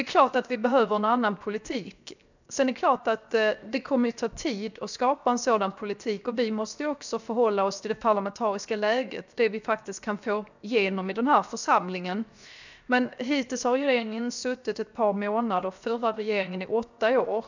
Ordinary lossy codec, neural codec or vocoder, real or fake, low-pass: none; codec, 16 kHz, 0.7 kbps, FocalCodec; fake; 7.2 kHz